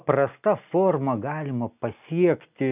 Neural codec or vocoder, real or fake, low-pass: none; real; 3.6 kHz